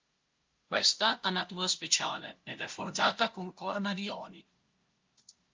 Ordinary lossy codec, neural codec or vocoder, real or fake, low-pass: Opus, 24 kbps; codec, 16 kHz, 0.5 kbps, FunCodec, trained on LibriTTS, 25 frames a second; fake; 7.2 kHz